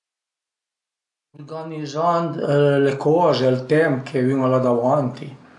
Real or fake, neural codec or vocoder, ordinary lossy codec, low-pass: real; none; AAC, 64 kbps; 10.8 kHz